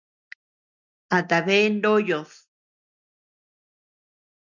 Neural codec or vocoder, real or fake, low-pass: none; real; 7.2 kHz